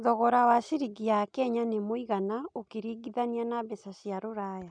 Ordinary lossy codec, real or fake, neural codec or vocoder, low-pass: none; real; none; 9.9 kHz